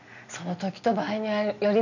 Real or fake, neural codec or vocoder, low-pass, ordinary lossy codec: real; none; 7.2 kHz; none